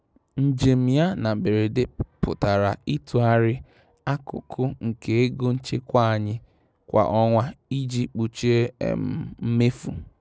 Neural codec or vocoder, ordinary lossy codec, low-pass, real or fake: none; none; none; real